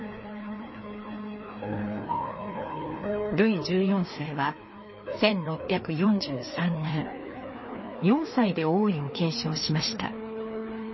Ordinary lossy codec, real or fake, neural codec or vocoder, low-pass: MP3, 24 kbps; fake; codec, 16 kHz, 2 kbps, FreqCodec, larger model; 7.2 kHz